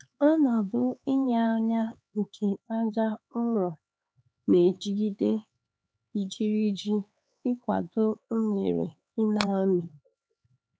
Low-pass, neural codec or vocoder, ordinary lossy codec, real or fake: none; codec, 16 kHz, 4 kbps, X-Codec, HuBERT features, trained on LibriSpeech; none; fake